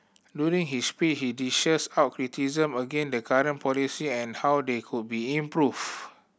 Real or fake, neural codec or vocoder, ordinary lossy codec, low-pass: real; none; none; none